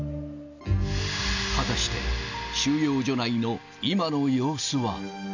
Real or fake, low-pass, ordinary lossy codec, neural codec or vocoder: real; 7.2 kHz; none; none